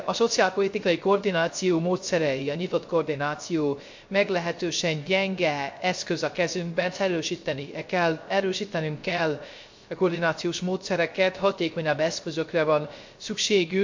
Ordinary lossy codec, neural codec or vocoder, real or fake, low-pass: MP3, 48 kbps; codec, 16 kHz, 0.3 kbps, FocalCodec; fake; 7.2 kHz